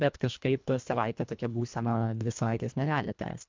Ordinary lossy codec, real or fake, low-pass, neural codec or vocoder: MP3, 64 kbps; fake; 7.2 kHz; codec, 24 kHz, 1.5 kbps, HILCodec